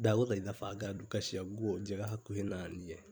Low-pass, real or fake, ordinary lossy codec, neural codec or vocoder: none; fake; none; vocoder, 44.1 kHz, 128 mel bands every 256 samples, BigVGAN v2